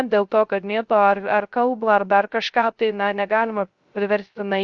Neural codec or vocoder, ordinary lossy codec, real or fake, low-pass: codec, 16 kHz, 0.3 kbps, FocalCodec; Opus, 64 kbps; fake; 7.2 kHz